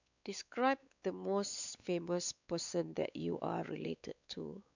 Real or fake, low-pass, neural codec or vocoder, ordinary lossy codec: fake; 7.2 kHz; codec, 16 kHz, 4 kbps, X-Codec, WavLM features, trained on Multilingual LibriSpeech; none